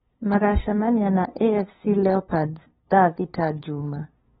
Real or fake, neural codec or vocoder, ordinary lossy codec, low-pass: fake; codec, 16 kHz, 2 kbps, FunCodec, trained on LibriTTS, 25 frames a second; AAC, 16 kbps; 7.2 kHz